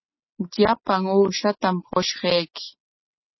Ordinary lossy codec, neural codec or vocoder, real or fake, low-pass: MP3, 24 kbps; none; real; 7.2 kHz